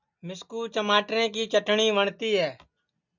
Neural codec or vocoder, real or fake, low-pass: none; real; 7.2 kHz